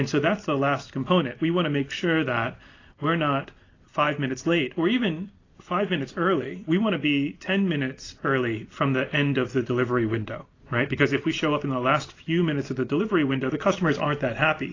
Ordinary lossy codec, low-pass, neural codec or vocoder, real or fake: AAC, 32 kbps; 7.2 kHz; none; real